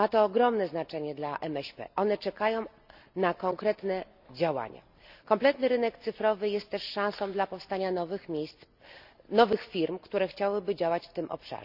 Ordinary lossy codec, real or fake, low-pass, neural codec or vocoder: none; real; 5.4 kHz; none